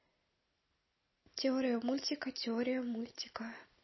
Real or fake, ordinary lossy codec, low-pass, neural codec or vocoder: real; MP3, 24 kbps; 7.2 kHz; none